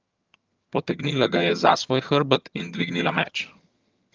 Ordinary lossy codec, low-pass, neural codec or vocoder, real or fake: Opus, 24 kbps; 7.2 kHz; vocoder, 22.05 kHz, 80 mel bands, HiFi-GAN; fake